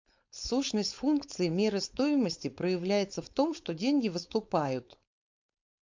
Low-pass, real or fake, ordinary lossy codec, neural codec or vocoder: 7.2 kHz; fake; MP3, 64 kbps; codec, 16 kHz, 4.8 kbps, FACodec